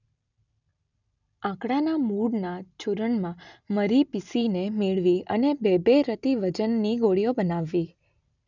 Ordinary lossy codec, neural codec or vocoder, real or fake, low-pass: none; none; real; 7.2 kHz